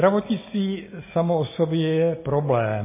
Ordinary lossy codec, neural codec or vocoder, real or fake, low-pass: MP3, 16 kbps; none; real; 3.6 kHz